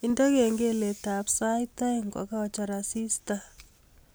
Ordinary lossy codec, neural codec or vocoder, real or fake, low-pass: none; none; real; none